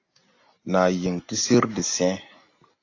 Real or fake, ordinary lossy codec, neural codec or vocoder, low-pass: real; AAC, 48 kbps; none; 7.2 kHz